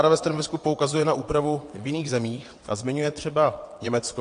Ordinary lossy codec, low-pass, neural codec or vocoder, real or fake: AAC, 48 kbps; 9.9 kHz; vocoder, 22.05 kHz, 80 mel bands, WaveNeXt; fake